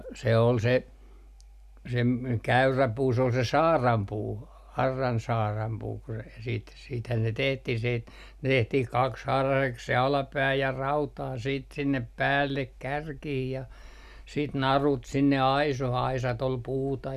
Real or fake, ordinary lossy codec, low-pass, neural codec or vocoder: real; none; 14.4 kHz; none